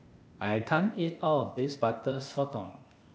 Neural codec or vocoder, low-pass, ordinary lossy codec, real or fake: codec, 16 kHz, 0.8 kbps, ZipCodec; none; none; fake